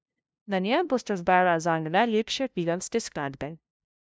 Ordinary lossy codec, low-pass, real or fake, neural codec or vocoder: none; none; fake; codec, 16 kHz, 0.5 kbps, FunCodec, trained on LibriTTS, 25 frames a second